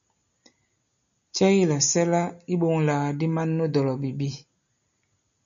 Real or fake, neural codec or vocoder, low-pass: real; none; 7.2 kHz